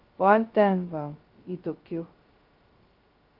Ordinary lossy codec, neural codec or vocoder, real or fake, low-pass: Opus, 32 kbps; codec, 16 kHz, 0.2 kbps, FocalCodec; fake; 5.4 kHz